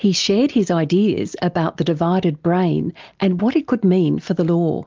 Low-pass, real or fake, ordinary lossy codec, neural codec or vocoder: 7.2 kHz; real; Opus, 32 kbps; none